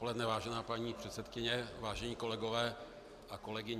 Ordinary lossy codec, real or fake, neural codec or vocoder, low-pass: AAC, 96 kbps; real; none; 14.4 kHz